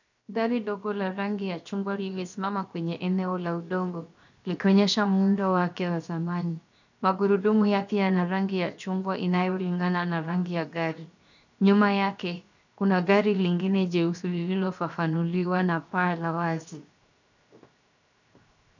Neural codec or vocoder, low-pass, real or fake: codec, 16 kHz, 0.7 kbps, FocalCodec; 7.2 kHz; fake